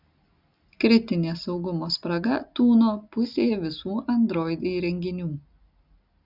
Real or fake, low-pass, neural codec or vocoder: real; 5.4 kHz; none